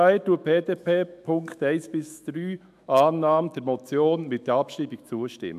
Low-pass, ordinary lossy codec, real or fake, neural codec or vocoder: 14.4 kHz; MP3, 96 kbps; fake; autoencoder, 48 kHz, 128 numbers a frame, DAC-VAE, trained on Japanese speech